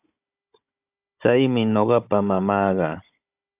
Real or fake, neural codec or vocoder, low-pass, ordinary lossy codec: fake; codec, 16 kHz, 16 kbps, FunCodec, trained on Chinese and English, 50 frames a second; 3.6 kHz; AAC, 32 kbps